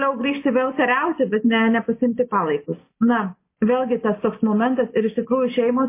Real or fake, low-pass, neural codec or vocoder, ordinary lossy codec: real; 3.6 kHz; none; MP3, 24 kbps